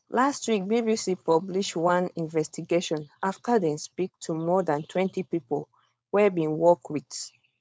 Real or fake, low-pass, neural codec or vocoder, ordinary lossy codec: fake; none; codec, 16 kHz, 4.8 kbps, FACodec; none